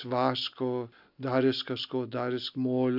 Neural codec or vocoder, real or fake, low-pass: none; real; 5.4 kHz